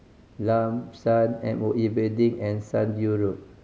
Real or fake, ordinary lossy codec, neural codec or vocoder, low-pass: real; none; none; none